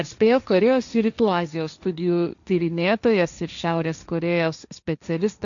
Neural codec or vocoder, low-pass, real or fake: codec, 16 kHz, 1.1 kbps, Voila-Tokenizer; 7.2 kHz; fake